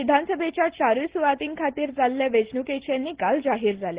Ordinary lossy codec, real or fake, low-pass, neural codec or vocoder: Opus, 16 kbps; real; 3.6 kHz; none